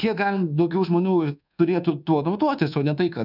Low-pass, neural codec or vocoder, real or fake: 5.4 kHz; codec, 24 kHz, 1.2 kbps, DualCodec; fake